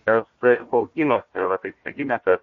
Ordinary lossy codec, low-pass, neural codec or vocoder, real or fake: MP3, 48 kbps; 7.2 kHz; codec, 16 kHz, 1 kbps, FunCodec, trained on Chinese and English, 50 frames a second; fake